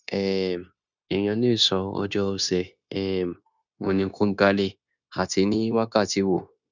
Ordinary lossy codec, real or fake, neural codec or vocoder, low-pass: none; fake; codec, 16 kHz, 0.9 kbps, LongCat-Audio-Codec; 7.2 kHz